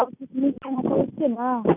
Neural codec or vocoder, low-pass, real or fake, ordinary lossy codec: none; 3.6 kHz; real; none